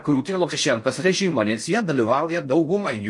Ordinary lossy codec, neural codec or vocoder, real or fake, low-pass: MP3, 48 kbps; codec, 16 kHz in and 24 kHz out, 0.6 kbps, FocalCodec, streaming, 4096 codes; fake; 10.8 kHz